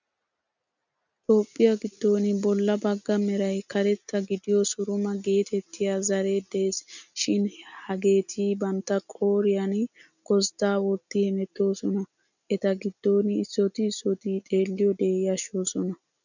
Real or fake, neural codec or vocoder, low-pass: real; none; 7.2 kHz